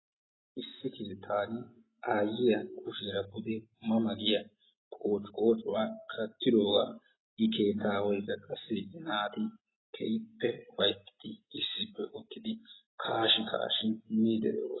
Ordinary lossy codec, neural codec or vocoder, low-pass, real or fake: AAC, 16 kbps; none; 7.2 kHz; real